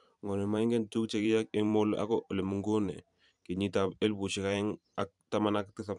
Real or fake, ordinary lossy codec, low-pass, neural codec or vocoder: fake; none; 10.8 kHz; vocoder, 44.1 kHz, 128 mel bands every 512 samples, BigVGAN v2